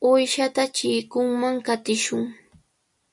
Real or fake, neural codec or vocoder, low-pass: real; none; 10.8 kHz